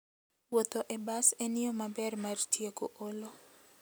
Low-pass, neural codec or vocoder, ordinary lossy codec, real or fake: none; none; none; real